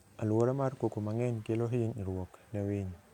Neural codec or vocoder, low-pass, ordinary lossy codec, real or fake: none; 19.8 kHz; none; real